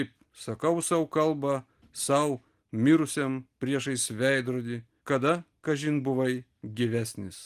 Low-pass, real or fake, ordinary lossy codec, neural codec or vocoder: 14.4 kHz; real; Opus, 24 kbps; none